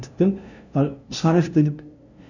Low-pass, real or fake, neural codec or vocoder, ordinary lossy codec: 7.2 kHz; fake; codec, 16 kHz, 0.5 kbps, FunCodec, trained on LibriTTS, 25 frames a second; none